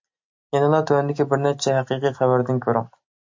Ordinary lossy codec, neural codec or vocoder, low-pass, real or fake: MP3, 48 kbps; none; 7.2 kHz; real